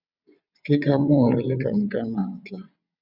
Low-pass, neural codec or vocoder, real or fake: 5.4 kHz; vocoder, 22.05 kHz, 80 mel bands, WaveNeXt; fake